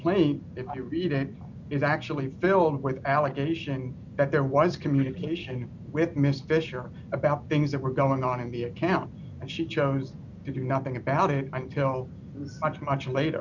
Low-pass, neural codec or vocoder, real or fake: 7.2 kHz; none; real